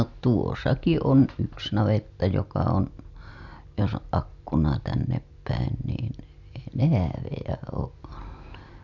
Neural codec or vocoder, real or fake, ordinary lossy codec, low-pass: none; real; none; 7.2 kHz